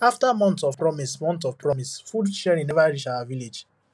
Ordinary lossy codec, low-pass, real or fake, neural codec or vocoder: none; none; real; none